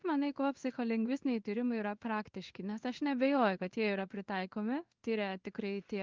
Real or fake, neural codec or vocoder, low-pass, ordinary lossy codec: fake; codec, 16 kHz in and 24 kHz out, 1 kbps, XY-Tokenizer; 7.2 kHz; Opus, 32 kbps